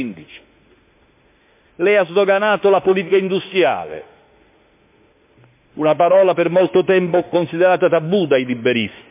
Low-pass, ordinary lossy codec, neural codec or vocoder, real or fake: 3.6 kHz; MP3, 32 kbps; autoencoder, 48 kHz, 32 numbers a frame, DAC-VAE, trained on Japanese speech; fake